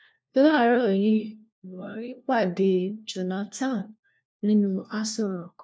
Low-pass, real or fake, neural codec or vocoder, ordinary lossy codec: none; fake; codec, 16 kHz, 1 kbps, FunCodec, trained on LibriTTS, 50 frames a second; none